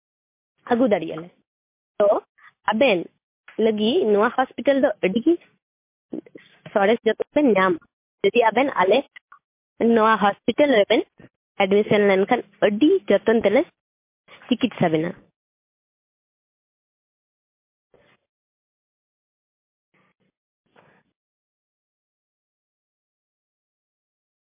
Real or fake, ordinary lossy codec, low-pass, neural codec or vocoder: real; MP3, 24 kbps; 3.6 kHz; none